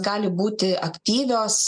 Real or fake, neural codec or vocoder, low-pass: real; none; 9.9 kHz